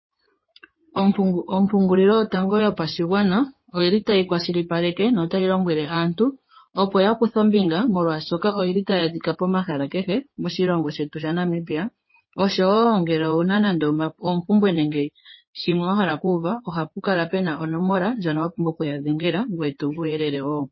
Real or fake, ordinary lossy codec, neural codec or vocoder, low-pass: fake; MP3, 24 kbps; codec, 16 kHz in and 24 kHz out, 2.2 kbps, FireRedTTS-2 codec; 7.2 kHz